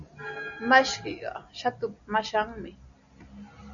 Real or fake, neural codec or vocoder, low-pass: real; none; 7.2 kHz